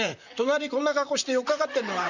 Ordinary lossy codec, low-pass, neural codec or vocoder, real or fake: Opus, 64 kbps; 7.2 kHz; vocoder, 44.1 kHz, 128 mel bands, Pupu-Vocoder; fake